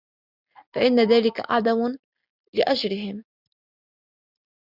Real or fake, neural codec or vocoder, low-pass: real; none; 5.4 kHz